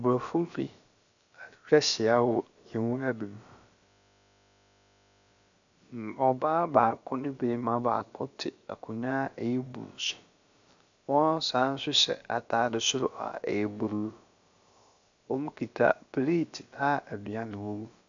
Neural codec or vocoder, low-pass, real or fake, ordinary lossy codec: codec, 16 kHz, about 1 kbps, DyCAST, with the encoder's durations; 7.2 kHz; fake; AAC, 64 kbps